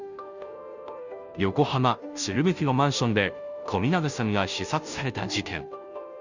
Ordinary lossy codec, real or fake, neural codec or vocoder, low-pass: none; fake; codec, 16 kHz, 0.5 kbps, FunCodec, trained on Chinese and English, 25 frames a second; 7.2 kHz